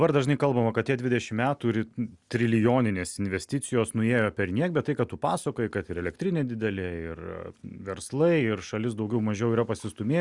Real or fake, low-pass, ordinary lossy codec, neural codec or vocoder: real; 10.8 kHz; Opus, 64 kbps; none